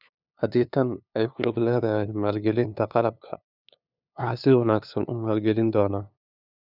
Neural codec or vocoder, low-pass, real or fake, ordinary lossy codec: codec, 16 kHz, 2 kbps, FunCodec, trained on LibriTTS, 25 frames a second; 5.4 kHz; fake; none